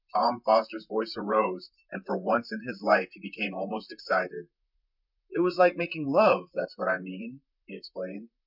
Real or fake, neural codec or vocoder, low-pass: fake; vocoder, 44.1 kHz, 128 mel bands, Pupu-Vocoder; 5.4 kHz